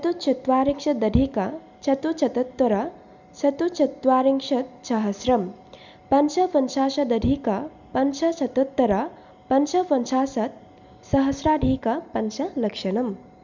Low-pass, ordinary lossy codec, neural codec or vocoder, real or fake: 7.2 kHz; none; none; real